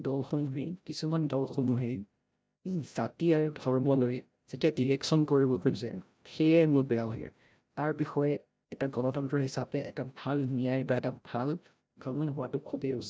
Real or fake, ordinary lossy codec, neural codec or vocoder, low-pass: fake; none; codec, 16 kHz, 0.5 kbps, FreqCodec, larger model; none